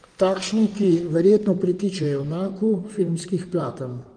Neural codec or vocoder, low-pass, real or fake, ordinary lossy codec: codec, 16 kHz in and 24 kHz out, 2.2 kbps, FireRedTTS-2 codec; 9.9 kHz; fake; Opus, 32 kbps